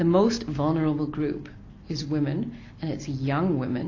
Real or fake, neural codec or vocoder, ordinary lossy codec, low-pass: real; none; AAC, 32 kbps; 7.2 kHz